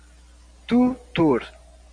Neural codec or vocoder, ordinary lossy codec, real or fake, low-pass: none; MP3, 64 kbps; real; 9.9 kHz